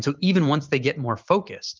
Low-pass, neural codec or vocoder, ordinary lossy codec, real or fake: 7.2 kHz; vocoder, 44.1 kHz, 128 mel bands every 512 samples, BigVGAN v2; Opus, 24 kbps; fake